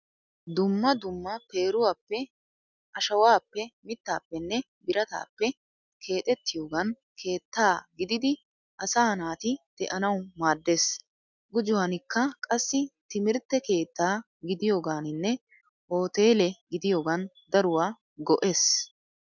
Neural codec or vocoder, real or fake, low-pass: none; real; 7.2 kHz